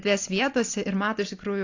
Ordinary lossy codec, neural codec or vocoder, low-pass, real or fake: AAC, 48 kbps; none; 7.2 kHz; real